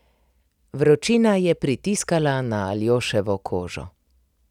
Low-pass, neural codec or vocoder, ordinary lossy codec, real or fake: 19.8 kHz; none; none; real